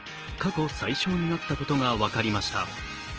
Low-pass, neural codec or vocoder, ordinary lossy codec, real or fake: 7.2 kHz; none; Opus, 16 kbps; real